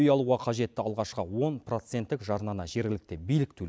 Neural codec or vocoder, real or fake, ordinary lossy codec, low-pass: none; real; none; none